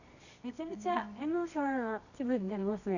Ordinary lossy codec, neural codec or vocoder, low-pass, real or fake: none; codec, 24 kHz, 0.9 kbps, WavTokenizer, medium music audio release; 7.2 kHz; fake